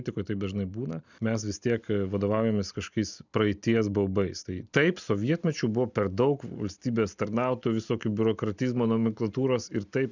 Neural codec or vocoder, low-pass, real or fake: none; 7.2 kHz; real